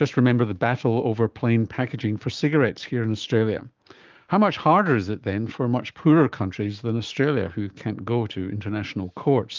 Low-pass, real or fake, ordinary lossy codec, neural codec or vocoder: 7.2 kHz; fake; Opus, 32 kbps; codec, 16 kHz, 6 kbps, DAC